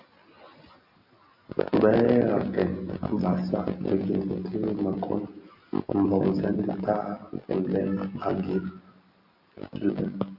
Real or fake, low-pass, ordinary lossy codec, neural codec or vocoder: real; 5.4 kHz; AAC, 48 kbps; none